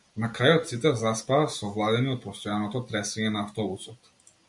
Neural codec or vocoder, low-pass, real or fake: none; 10.8 kHz; real